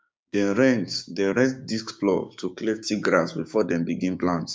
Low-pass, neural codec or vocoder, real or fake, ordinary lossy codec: none; codec, 16 kHz, 6 kbps, DAC; fake; none